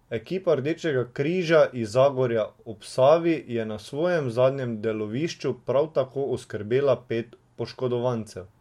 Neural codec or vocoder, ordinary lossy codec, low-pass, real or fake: none; MP3, 64 kbps; 19.8 kHz; real